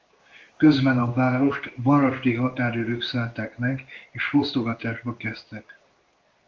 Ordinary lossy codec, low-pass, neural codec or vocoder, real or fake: Opus, 32 kbps; 7.2 kHz; codec, 16 kHz in and 24 kHz out, 1 kbps, XY-Tokenizer; fake